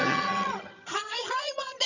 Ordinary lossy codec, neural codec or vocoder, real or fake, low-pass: none; vocoder, 22.05 kHz, 80 mel bands, HiFi-GAN; fake; 7.2 kHz